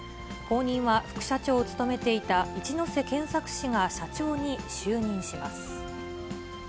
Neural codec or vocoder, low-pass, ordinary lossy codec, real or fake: none; none; none; real